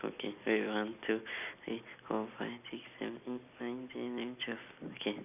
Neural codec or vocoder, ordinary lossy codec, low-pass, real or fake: none; none; 3.6 kHz; real